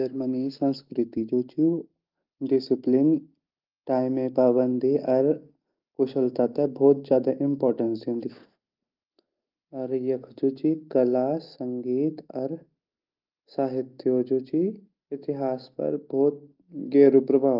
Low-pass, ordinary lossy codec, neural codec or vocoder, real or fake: 5.4 kHz; Opus, 32 kbps; none; real